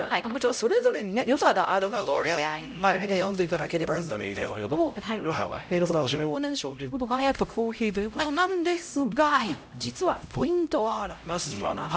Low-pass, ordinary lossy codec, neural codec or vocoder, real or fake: none; none; codec, 16 kHz, 0.5 kbps, X-Codec, HuBERT features, trained on LibriSpeech; fake